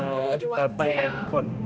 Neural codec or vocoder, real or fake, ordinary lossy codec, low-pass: codec, 16 kHz, 1 kbps, X-Codec, HuBERT features, trained on balanced general audio; fake; none; none